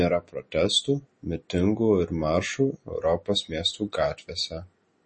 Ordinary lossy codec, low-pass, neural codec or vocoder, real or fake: MP3, 32 kbps; 10.8 kHz; none; real